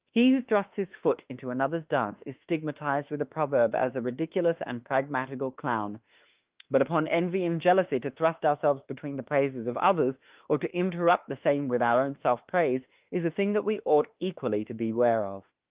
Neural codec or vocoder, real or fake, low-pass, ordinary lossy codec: autoencoder, 48 kHz, 32 numbers a frame, DAC-VAE, trained on Japanese speech; fake; 3.6 kHz; Opus, 32 kbps